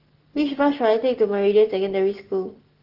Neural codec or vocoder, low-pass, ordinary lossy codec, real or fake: none; 5.4 kHz; Opus, 16 kbps; real